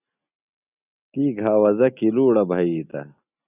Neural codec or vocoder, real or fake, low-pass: none; real; 3.6 kHz